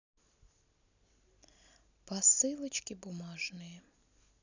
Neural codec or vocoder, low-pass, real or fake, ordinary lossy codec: none; 7.2 kHz; real; none